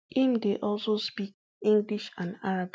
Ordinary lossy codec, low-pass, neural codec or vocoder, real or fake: none; none; none; real